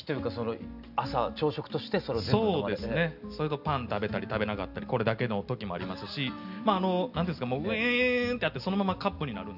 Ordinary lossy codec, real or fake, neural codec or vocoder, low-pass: none; real; none; 5.4 kHz